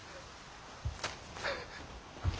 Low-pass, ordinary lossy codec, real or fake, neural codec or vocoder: none; none; real; none